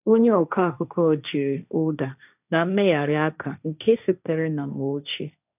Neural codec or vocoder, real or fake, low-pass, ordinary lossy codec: codec, 16 kHz, 1.1 kbps, Voila-Tokenizer; fake; 3.6 kHz; none